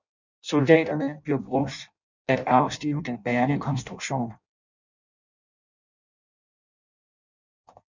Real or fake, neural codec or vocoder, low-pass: fake; codec, 16 kHz in and 24 kHz out, 0.6 kbps, FireRedTTS-2 codec; 7.2 kHz